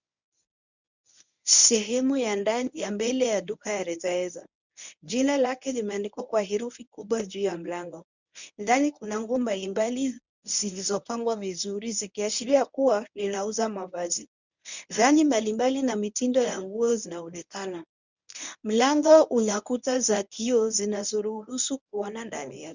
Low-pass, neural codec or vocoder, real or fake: 7.2 kHz; codec, 24 kHz, 0.9 kbps, WavTokenizer, medium speech release version 1; fake